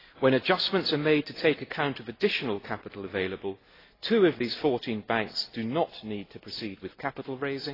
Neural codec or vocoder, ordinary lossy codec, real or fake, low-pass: none; AAC, 24 kbps; real; 5.4 kHz